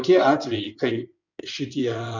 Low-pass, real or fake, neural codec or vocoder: 7.2 kHz; fake; codec, 16 kHz in and 24 kHz out, 2.2 kbps, FireRedTTS-2 codec